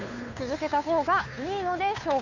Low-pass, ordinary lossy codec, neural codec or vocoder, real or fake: 7.2 kHz; none; codec, 16 kHz, 2 kbps, FunCodec, trained on Chinese and English, 25 frames a second; fake